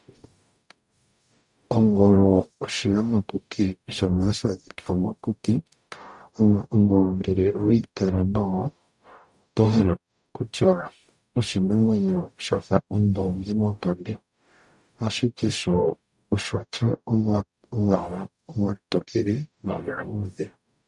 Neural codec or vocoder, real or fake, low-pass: codec, 44.1 kHz, 0.9 kbps, DAC; fake; 10.8 kHz